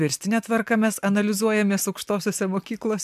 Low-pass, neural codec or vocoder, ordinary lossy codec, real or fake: 14.4 kHz; none; MP3, 96 kbps; real